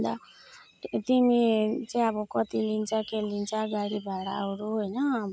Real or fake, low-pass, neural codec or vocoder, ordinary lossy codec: real; none; none; none